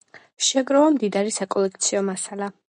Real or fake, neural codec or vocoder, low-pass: real; none; 9.9 kHz